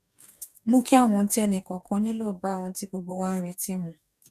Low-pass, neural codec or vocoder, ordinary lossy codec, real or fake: 14.4 kHz; codec, 44.1 kHz, 2.6 kbps, DAC; none; fake